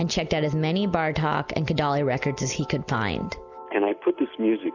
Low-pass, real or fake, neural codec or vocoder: 7.2 kHz; real; none